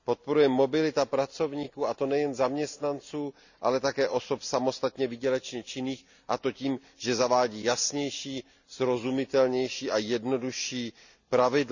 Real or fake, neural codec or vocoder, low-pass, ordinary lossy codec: real; none; 7.2 kHz; none